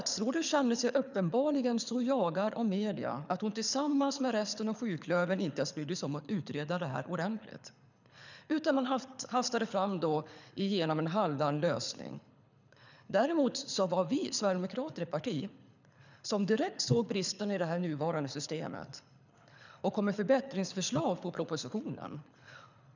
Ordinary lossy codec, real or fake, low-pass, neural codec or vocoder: none; fake; 7.2 kHz; codec, 24 kHz, 6 kbps, HILCodec